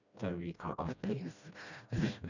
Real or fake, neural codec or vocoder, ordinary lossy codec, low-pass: fake; codec, 16 kHz, 1 kbps, FreqCodec, smaller model; none; 7.2 kHz